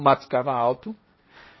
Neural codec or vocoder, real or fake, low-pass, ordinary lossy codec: codec, 16 kHz, 1.1 kbps, Voila-Tokenizer; fake; 7.2 kHz; MP3, 24 kbps